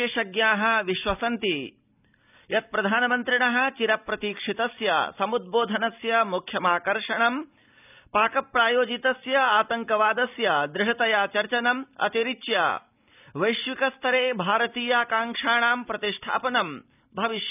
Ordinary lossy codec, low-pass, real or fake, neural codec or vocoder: none; 3.6 kHz; real; none